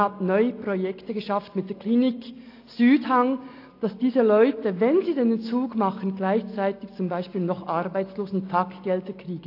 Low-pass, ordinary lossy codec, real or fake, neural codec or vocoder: 5.4 kHz; AAC, 32 kbps; real; none